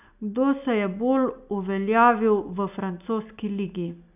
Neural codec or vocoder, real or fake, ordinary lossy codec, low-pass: none; real; none; 3.6 kHz